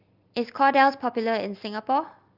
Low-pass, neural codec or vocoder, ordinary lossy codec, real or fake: 5.4 kHz; none; Opus, 32 kbps; real